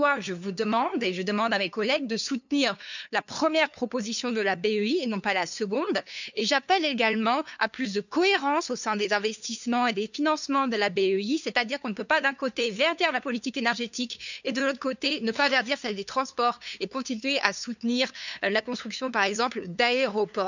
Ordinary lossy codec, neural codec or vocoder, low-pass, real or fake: none; codec, 16 kHz, 2 kbps, FunCodec, trained on LibriTTS, 25 frames a second; 7.2 kHz; fake